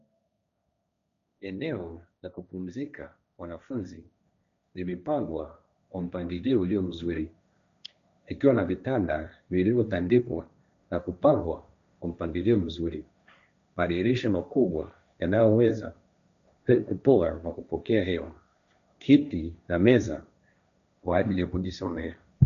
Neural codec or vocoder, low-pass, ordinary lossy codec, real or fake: codec, 16 kHz, 1.1 kbps, Voila-Tokenizer; 7.2 kHz; MP3, 96 kbps; fake